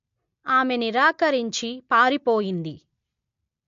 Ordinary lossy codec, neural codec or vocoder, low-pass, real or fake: MP3, 48 kbps; none; 7.2 kHz; real